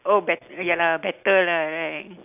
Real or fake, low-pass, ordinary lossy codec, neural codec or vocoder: real; 3.6 kHz; none; none